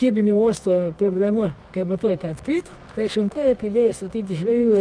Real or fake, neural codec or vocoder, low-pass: fake; codec, 24 kHz, 0.9 kbps, WavTokenizer, medium music audio release; 9.9 kHz